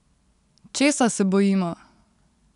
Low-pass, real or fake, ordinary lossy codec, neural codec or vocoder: 10.8 kHz; real; none; none